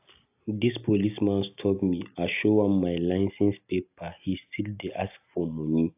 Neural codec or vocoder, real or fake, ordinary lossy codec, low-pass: none; real; AAC, 32 kbps; 3.6 kHz